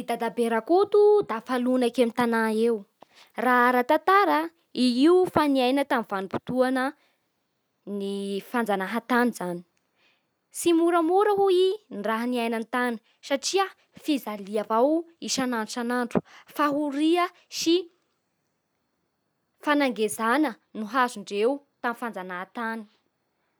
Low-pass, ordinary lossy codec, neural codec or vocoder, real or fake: none; none; none; real